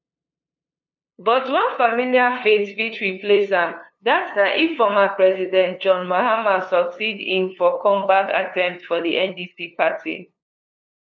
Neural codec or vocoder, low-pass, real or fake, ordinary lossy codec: codec, 16 kHz, 2 kbps, FunCodec, trained on LibriTTS, 25 frames a second; 7.2 kHz; fake; none